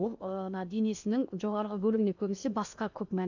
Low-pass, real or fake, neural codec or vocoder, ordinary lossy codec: 7.2 kHz; fake; codec, 16 kHz in and 24 kHz out, 0.8 kbps, FocalCodec, streaming, 65536 codes; none